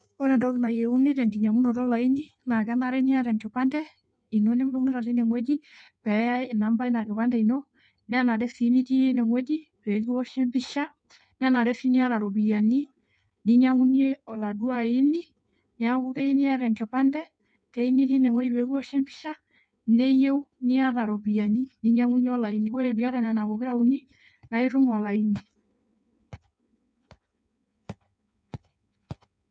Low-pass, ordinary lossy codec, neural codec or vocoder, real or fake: 9.9 kHz; none; codec, 16 kHz in and 24 kHz out, 1.1 kbps, FireRedTTS-2 codec; fake